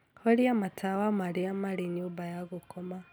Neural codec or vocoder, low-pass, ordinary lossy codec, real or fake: none; none; none; real